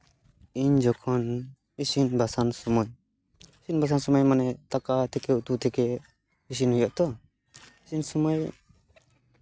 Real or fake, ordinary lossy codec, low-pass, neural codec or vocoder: real; none; none; none